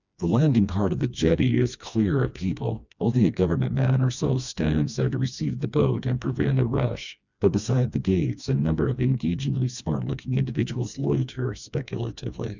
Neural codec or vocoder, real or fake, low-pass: codec, 16 kHz, 2 kbps, FreqCodec, smaller model; fake; 7.2 kHz